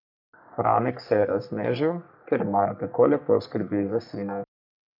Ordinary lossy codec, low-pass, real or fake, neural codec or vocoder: none; 5.4 kHz; fake; codec, 44.1 kHz, 3.4 kbps, Pupu-Codec